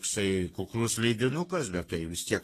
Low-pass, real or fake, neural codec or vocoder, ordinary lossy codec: 14.4 kHz; fake; codec, 32 kHz, 1.9 kbps, SNAC; AAC, 48 kbps